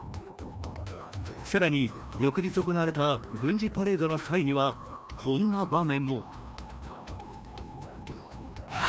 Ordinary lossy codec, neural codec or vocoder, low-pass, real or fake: none; codec, 16 kHz, 1 kbps, FreqCodec, larger model; none; fake